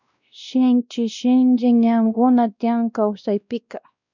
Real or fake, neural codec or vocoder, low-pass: fake; codec, 16 kHz, 1 kbps, X-Codec, WavLM features, trained on Multilingual LibriSpeech; 7.2 kHz